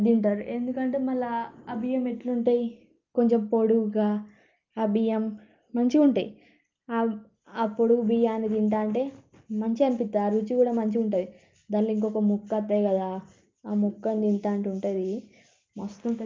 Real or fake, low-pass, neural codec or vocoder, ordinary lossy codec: real; 7.2 kHz; none; Opus, 24 kbps